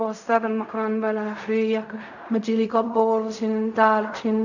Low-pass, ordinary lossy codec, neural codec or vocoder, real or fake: 7.2 kHz; none; codec, 16 kHz in and 24 kHz out, 0.4 kbps, LongCat-Audio-Codec, fine tuned four codebook decoder; fake